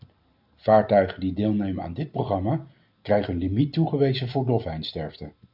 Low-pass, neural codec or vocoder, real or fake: 5.4 kHz; none; real